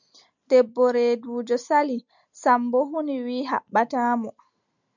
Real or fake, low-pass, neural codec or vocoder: real; 7.2 kHz; none